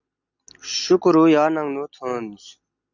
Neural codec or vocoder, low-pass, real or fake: none; 7.2 kHz; real